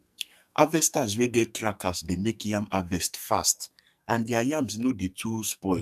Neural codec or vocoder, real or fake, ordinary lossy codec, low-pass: codec, 44.1 kHz, 2.6 kbps, SNAC; fake; none; 14.4 kHz